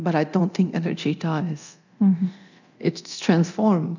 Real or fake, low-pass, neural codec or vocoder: fake; 7.2 kHz; codec, 24 kHz, 0.9 kbps, DualCodec